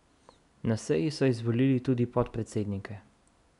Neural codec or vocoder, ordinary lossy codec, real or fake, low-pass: none; none; real; 10.8 kHz